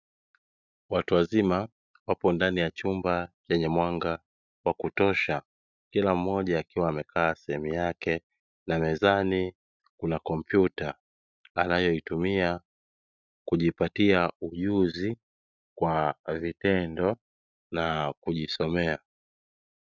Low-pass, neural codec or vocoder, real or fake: 7.2 kHz; none; real